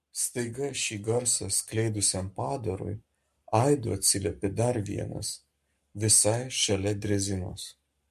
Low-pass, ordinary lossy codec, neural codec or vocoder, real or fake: 14.4 kHz; MP3, 64 kbps; codec, 44.1 kHz, 7.8 kbps, Pupu-Codec; fake